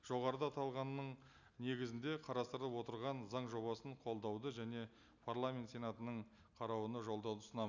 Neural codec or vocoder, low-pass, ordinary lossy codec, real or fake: none; 7.2 kHz; none; real